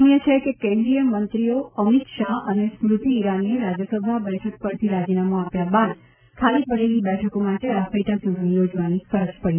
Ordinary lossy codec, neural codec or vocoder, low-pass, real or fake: none; none; 3.6 kHz; real